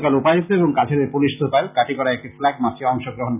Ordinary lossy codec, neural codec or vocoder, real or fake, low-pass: none; none; real; 3.6 kHz